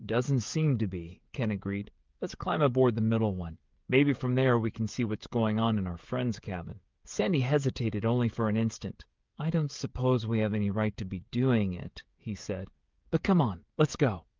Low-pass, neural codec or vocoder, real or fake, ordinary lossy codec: 7.2 kHz; codec, 16 kHz, 16 kbps, FreqCodec, smaller model; fake; Opus, 32 kbps